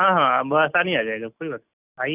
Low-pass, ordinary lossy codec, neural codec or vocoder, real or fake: 3.6 kHz; none; none; real